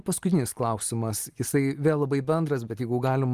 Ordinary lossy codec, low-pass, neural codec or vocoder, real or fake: Opus, 24 kbps; 14.4 kHz; none; real